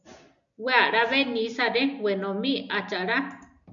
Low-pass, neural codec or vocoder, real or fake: 7.2 kHz; none; real